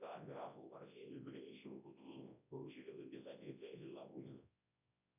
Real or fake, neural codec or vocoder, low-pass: fake; codec, 24 kHz, 0.9 kbps, WavTokenizer, large speech release; 3.6 kHz